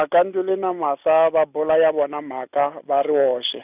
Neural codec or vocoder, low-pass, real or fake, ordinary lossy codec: none; 3.6 kHz; real; none